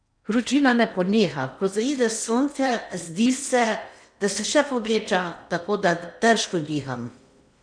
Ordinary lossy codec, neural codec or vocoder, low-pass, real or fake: none; codec, 16 kHz in and 24 kHz out, 0.8 kbps, FocalCodec, streaming, 65536 codes; 9.9 kHz; fake